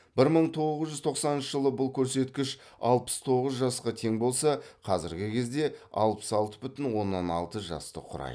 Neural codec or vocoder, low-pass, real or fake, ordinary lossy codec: none; none; real; none